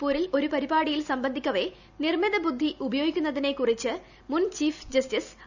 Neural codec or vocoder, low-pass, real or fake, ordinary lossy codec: none; 7.2 kHz; real; none